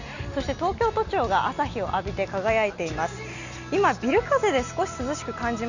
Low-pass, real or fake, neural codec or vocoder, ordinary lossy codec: 7.2 kHz; real; none; none